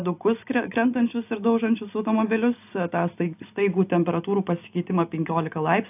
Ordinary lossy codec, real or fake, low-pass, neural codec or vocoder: AAC, 32 kbps; real; 3.6 kHz; none